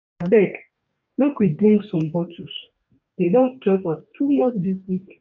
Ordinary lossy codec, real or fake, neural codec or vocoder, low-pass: MP3, 64 kbps; fake; codec, 44.1 kHz, 2.6 kbps, DAC; 7.2 kHz